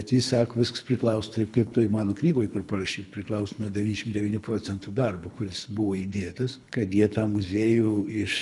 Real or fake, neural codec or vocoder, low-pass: fake; codec, 24 kHz, 3 kbps, HILCodec; 10.8 kHz